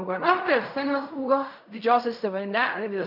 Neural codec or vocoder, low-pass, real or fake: codec, 16 kHz in and 24 kHz out, 0.4 kbps, LongCat-Audio-Codec, fine tuned four codebook decoder; 5.4 kHz; fake